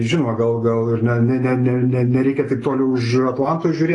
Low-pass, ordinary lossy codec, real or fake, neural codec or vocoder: 10.8 kHz; AAC, 32 kbps; real; none